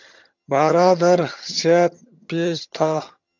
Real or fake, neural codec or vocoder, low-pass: fake; vocoder, 22.05 kHz, 80 mel bands, HiFi-GAN; 7.2 kHz